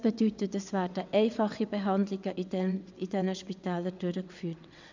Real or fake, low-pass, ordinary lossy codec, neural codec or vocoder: fake; 7.2 kHz; none; vocoder, 22.05 kHz, 80 mel bands, WaveNeXt